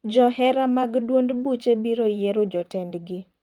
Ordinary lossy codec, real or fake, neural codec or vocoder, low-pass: Opus, 32 kbps; fake; vocoder, 44.1 kHz, 128 mel bands, Pupu-Vocoder; 19.8 kHz